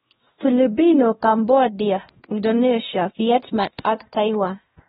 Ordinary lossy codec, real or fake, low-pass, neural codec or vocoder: AAC, 16 kbps; fake; 7.2 kHz; codec, 16 kHz, 1 kbps, X-Codec, WavLM features, trained on Multilingual LibriSpeech